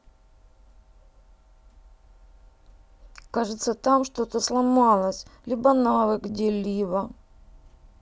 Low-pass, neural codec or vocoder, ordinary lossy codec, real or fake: none; none; none; real